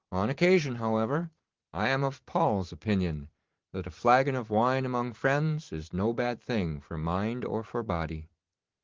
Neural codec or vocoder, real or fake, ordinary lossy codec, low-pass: vocoder, 44.1 kHz, 128 mel bands every 512 samples, BigVGAN v2; fake; Opus, 16 kbps; 7.2 kHz